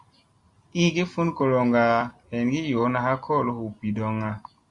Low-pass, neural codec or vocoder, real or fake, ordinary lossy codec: 10.8 kHz; none; real; Opus, 64 kbps